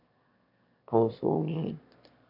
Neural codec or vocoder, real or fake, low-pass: autoencoder, 22.05 kHz, a latent of 192 numbers a frame, VITS, trained on one speaker; fake; 5.4 kHz